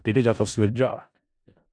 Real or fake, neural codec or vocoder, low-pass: fake; codec, 16 kHz in and 24 kHz out, 0.4 kbps, LongCat-Audio-Codec, four codebook decoder; 9.9 kHz